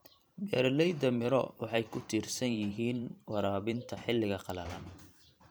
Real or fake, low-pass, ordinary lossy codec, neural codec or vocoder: fake; none; none; vocoder, 44.1 kHz, 128 mel bands every 512 samples, BigVGAN v2